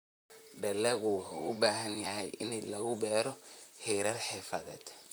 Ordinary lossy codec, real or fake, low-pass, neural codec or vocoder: none; fake; none; vocoder, 44.1 kHz, 128 mel bands, Pupu-Vocoder